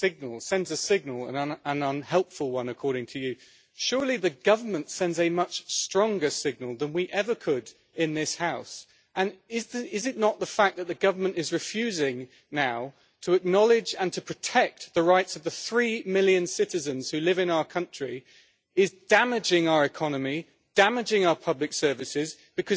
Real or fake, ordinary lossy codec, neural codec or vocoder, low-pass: real; none; none; none